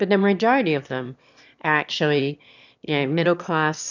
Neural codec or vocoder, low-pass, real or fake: autoencoder, 22.05 kHz, a latent of 192 numbers a frame, VITS, trained on one speaker; 7.2 kHz; fake